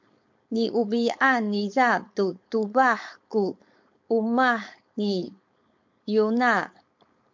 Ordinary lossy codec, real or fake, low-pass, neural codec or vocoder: MP3, 48 kbps; fake; 7.2 kHz; codec, 16 kHz, 4.8 kbps, FACodec